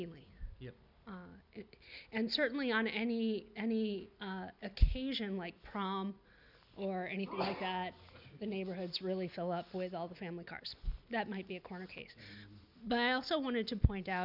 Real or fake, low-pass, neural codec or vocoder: real; 5.4 kHz; none